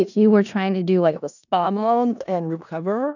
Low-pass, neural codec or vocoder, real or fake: 7.2 kHz; codec, 16 kHz in and 24 kHz out, 0.4 kbps, LongCat-Audio-Codec, four codebook decoder; fake